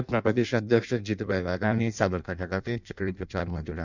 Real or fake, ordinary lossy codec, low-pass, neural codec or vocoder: fake; none; 7.2 kHz; codec, 16 kHz in and 24 kHz out, 0.6 kbps, FireRedTTS-2 codec